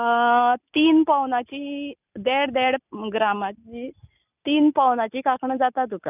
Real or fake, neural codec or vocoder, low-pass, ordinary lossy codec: real; none; 3.6 kHz; none